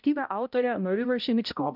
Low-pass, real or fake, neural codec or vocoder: 5.4 kHz; fake; codec, 16 kHz, 0.5 kbps, X-Codec, HuBERT features, trained on balanced general audio